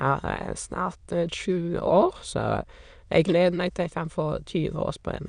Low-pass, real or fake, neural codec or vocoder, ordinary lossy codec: 9.9 kHz; fake; autoencoder, 22.05 kHz, a latent of 192 numbers a frame, VITS, trained on many speakers; none